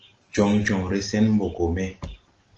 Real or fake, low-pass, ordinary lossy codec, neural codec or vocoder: real; 7.2 kHz; Opus, 16 kbps; none